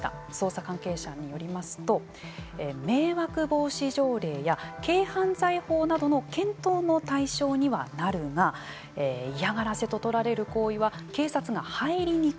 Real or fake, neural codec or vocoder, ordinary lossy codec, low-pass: real; none; none; none